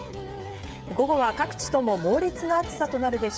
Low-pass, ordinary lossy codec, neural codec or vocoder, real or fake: none; none; codec, 16 kHz, 8 kbps, FreqCodec, smaller model; fake